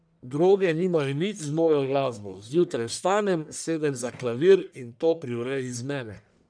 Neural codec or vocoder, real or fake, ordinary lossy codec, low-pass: codec, 44.1 kHz, 1.7 kbps, Pupu-Codec; fake; none; 9.9 kHz